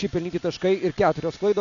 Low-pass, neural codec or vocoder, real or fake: 7.2 kHz; none; real